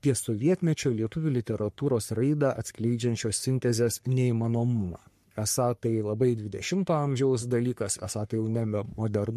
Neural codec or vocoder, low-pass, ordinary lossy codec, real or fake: codec, 44.1 kHz, 3.4 kbps, Pupu-Codec; 14.4 kHz; MP3, 64 kbps; fake